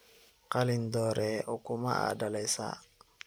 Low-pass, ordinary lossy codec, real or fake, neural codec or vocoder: none; none; real; none